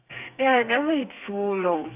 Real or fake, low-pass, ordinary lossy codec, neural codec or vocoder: fake; 3.6 kHz; AAC, 32 kbps; codec, 32 kHz, 1.9 kbps, SNAC